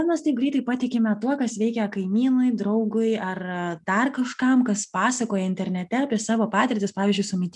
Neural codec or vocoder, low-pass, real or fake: none; 10.8 kHz; real